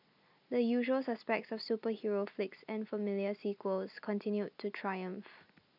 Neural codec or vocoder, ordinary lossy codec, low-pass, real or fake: none; none; 5.4 kHz; real